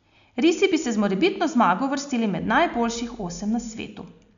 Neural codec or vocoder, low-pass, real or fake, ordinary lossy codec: none; 7.2 kHz; real; none